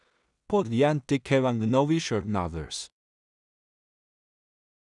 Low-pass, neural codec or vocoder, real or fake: 10.8 kHz; codec, 16 kHz in and 24 kHz out, 0.4 kbps, LongCat-Audio-Codec, two codebook decoder; fake